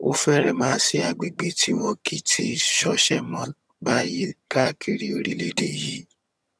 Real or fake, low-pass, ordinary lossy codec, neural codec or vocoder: fake; none; none; vocoder, 22.05 kHz, 80 mel bands, HiFi-GAN